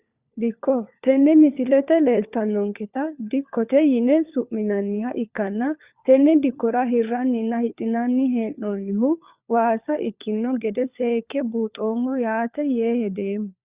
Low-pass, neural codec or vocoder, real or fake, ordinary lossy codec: 3.6 kHz; codec, 16 kHz, 4 kbps, FunCodec, trained on LibriTTS, 50 frames a second; fake; Opus, 64 kbps